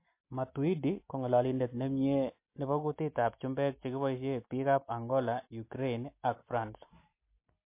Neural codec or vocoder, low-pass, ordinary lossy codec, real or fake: none; 3.6 kHz; MP3, 24 kbps; real